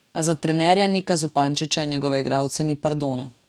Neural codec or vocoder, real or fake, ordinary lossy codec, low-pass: codec, 44.1 kHz, 2.6 kbps, DAC; fake; none; 19.8 kHz